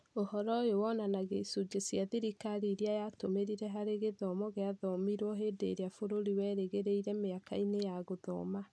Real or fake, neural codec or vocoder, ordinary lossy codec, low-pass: real; none; none; none